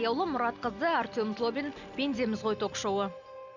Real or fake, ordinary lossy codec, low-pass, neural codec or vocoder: real; none; 7.2 kHz; none